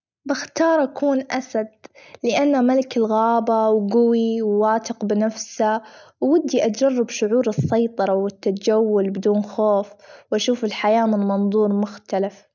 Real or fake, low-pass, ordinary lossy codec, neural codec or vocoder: real; 7.2 kHz; none; none